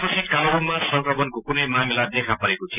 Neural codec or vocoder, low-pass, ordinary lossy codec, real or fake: none; 3.6 kHz; none; real